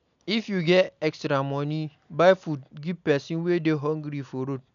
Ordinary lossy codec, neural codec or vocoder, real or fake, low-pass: none; none; real; 7.2 kHz